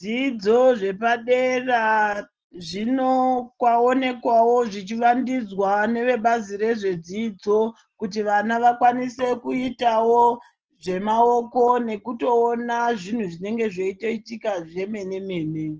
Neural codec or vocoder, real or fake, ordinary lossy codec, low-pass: none; real; Opus, 16 kbps; 7.2 kHz